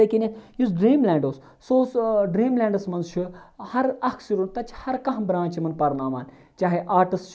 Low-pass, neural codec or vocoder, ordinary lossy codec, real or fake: none; none; none; real